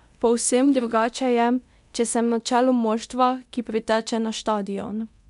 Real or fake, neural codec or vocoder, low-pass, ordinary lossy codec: fake; codec, 24 kHz, 0.9 kbps, DualCodec; 10.8 kHz; none